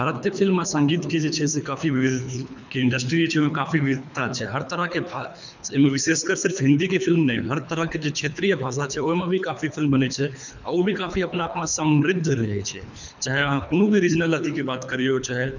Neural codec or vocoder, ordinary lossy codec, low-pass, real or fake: codec, 24 kHz, 3 kbps, HILCodec; none; 7.2 kHz; fake